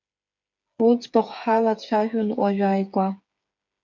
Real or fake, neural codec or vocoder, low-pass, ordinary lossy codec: fake; codec, 16 kHz, 8 kbps, FreqCodec, smaller model; 7.2 kHz; MP3, 64 kbps